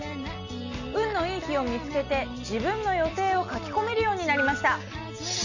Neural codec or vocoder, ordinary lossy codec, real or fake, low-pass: none; none; real; 7.2 kHz